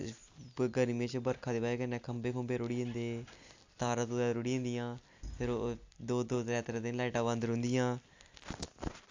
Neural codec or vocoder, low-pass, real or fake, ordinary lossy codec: none; 7.2 kHz; real; AAC, 48 kbps